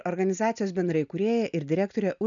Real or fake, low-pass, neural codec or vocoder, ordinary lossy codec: real; 7.2 kHz; none; MP3, 96 kbps